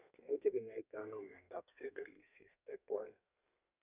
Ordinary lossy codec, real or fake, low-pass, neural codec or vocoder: none; fake; 3.6 kHz; codec, 32 kHz, 1.9 kbps, SNAC